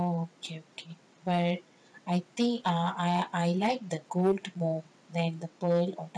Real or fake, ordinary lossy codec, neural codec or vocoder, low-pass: fake; none; vocoder, 22.05 kHz, 80 mel bands, WaveNeXt; none